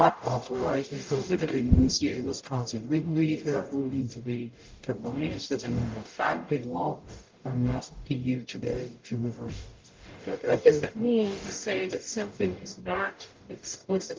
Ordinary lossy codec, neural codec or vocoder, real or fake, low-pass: Opus, 32 kbps; codec, 44.1 kHz, 0.9 kbps, DAC; fake; 7.2 kHz